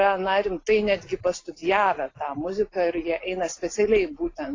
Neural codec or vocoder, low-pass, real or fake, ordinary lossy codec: none; 7.2 kHz; real; AAC, 32 kbps